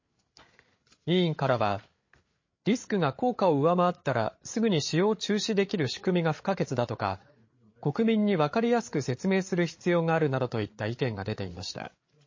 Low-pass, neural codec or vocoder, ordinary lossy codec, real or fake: 7.2 kHz; codec, 16 kHz, 8 kbps, FreqCodec, larger model; MP3, 32 kbps; fake